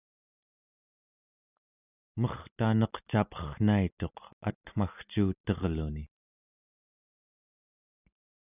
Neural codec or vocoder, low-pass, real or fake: none; 3.6 kHz; real